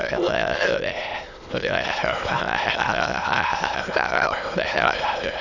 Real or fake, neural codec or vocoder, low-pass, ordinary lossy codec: fake; autoencoder, 22.05 kHz, a latent of 192 numbers a frame, VITS, trained on many speakers; 7.2 kHz; none